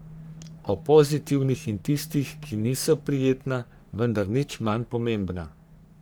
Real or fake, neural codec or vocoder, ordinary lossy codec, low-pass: fake; codec, 44.1 kHz, 3.4 kbps, Pupu-Codec; none; none